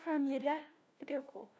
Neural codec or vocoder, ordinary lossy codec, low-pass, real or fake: codec, 16 kHz, 1 kbps, FunCodec, trained on LibriTTS, 50 frames a second; none; none; fake